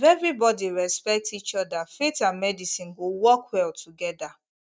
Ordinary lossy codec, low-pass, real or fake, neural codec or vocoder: none; none; real; none